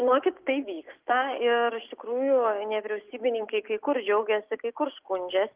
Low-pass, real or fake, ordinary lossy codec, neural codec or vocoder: 3.6 kHz; real; Opus, 32 kbps; none